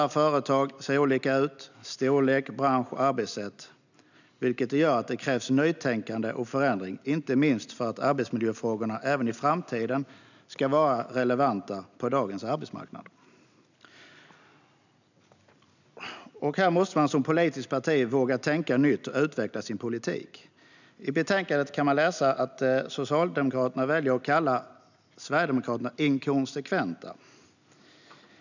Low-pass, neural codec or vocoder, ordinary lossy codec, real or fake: 7.2 kHz; none; none; real